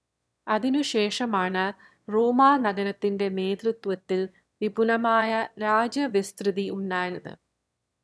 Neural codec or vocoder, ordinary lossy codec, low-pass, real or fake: autoencoder, 22.05 kHz, a latent of 192 numbers a frame, VITS, trained on one speaker; none; none; fake